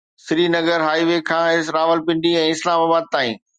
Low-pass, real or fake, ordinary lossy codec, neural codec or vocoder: 9.9 kHz; real; Opus, 64 kbps; none